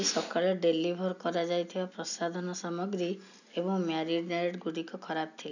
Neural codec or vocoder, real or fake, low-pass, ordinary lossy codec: none; real; 7.2 kHz; none